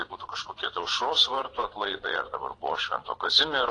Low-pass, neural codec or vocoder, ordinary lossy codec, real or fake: 9.9 kHz; vocoder, 22.05 kHz, 80 mel bands, Vocos; AAC, 32 kbps; fake